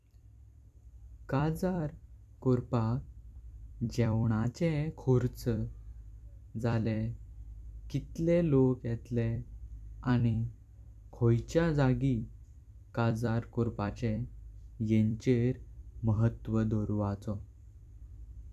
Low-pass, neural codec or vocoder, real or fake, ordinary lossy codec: 14.4 kHz; vocoder, 44.1 kHz, 128 mel bands every 256 samples, BigVGAN v2; fake; none